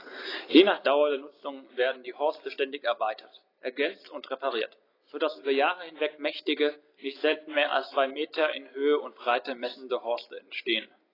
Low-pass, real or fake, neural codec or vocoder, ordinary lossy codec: 5.4 kHz; real; none; AAC, 24 kbps